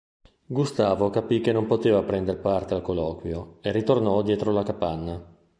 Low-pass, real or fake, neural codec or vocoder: 9.9 kHz; real; none